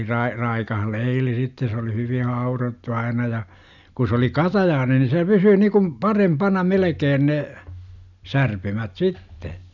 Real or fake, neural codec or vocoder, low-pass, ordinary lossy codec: real; none; 7.2 kHz; none